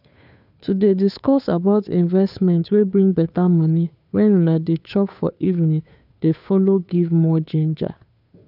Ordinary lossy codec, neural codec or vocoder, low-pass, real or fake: none; codec, 16 kHz, 2 kbps, FunCodec, trained on Chinese and English, 25 frames a second; 5.4 kHz; fake